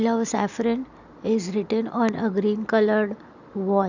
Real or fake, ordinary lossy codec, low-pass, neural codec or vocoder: real; none; 7.2 kHz; none